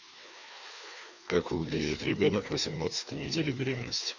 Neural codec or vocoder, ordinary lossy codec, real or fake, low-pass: codec, 16 kHz, 2 kbps, FreqCodec, larger model; none; fake; 7.2 kHz